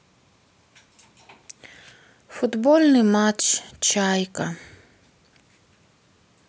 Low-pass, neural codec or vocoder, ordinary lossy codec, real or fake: none; none; none; real